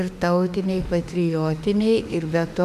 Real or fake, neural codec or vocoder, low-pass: fake; autoencoder, 48 kHz, 32 numbers a frame, DAC-VAE, trained on Japanese speech; 14.4 kHz